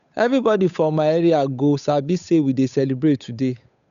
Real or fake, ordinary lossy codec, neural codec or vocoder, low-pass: fake; none; codec, 16 kHz, 8 kbps, FunCodec, trained on Chinese and English, 25 frames a second; 7.2 kHz